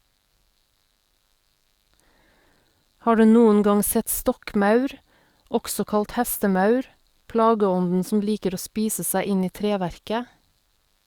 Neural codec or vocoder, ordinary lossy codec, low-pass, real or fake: codec, 44.1 kHz, 7.8 kbps, DAC; Opus, 64 kbps; 19.8 kHz; fake